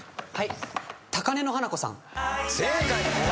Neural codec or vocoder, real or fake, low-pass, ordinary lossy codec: none; real; none; none